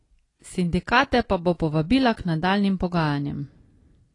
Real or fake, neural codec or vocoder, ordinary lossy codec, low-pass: real; none; AAC, 32 kbps; 10.8 kHz